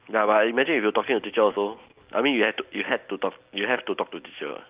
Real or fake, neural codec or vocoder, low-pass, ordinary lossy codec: real; none; 3.6 kHz; Opus, 24 kbps